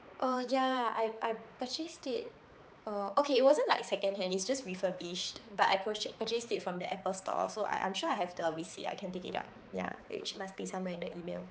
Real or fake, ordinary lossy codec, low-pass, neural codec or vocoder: fake; none; none; codec, 16 kHz, 4 kbps, X-Codec, HuBERT features, trained on balanced general audio